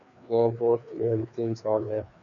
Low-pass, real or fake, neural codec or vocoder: 7.2 kHz; fake; codec, 16 kHz, 2 kbps, FreqCodec, larger model